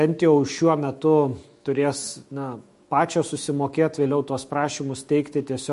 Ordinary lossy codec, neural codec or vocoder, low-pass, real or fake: MP3, 48 kbps; autoencoder, 48 kHz, 128 numbers a frame, DAC-VAE, trained on Japanese speech; 14.4 kHz; fake